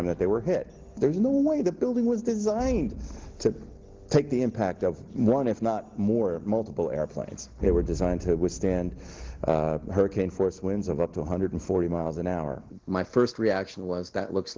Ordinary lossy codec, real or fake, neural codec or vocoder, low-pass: Opus, 16 kbps; real; none; 7.2 kHz